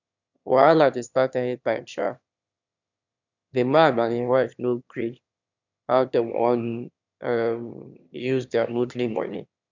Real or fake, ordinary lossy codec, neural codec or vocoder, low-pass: fake; none; autoencoder, 22.05 kHz, a latent of 192 numbers a frame, VITS, trained on one speaker; 7.2 kHz